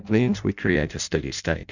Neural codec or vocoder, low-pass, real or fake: codec, 16 kHz in and 24 kHz out, 0.6 kbps, FireRedTTS-2 codec; 7.2 kHz; fake